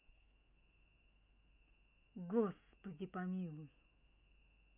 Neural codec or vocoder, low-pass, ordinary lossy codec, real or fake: autoencoder, 48 kHz, 128 numbers a frame, DAC-VAE, trained on Japanese speech; 3.6 kHz; none; fake